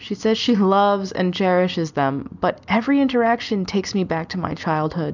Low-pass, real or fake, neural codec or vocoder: 7.2 kHz; real; none